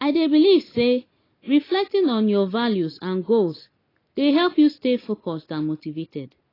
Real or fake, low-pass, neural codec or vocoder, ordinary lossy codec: real; 5.4 kHz; none; AAC, 24 kbps